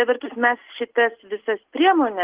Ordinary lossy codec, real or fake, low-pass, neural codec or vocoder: Opus, 16 kbps; real; 3.6 kHz; none